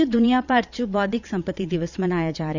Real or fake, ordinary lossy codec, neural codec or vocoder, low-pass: fake; none; vocoder, 22.05 kHz, 80 mel bands, Vocos; 7.2 kHz